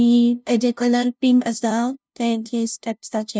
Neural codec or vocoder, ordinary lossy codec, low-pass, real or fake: codec, 16 kHz, 0.5 kbps, FunCodec, trained on LibriTTS, 25 frames a second; none; none; fake